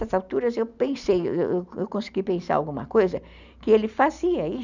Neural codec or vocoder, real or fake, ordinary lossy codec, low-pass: none; real; none; 7.2 kHz